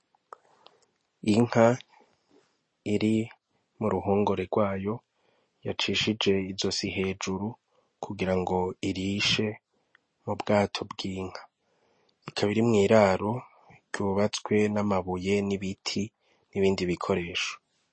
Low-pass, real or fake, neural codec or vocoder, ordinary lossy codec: 9.9 kHz; real; none; MP3, 32 kbps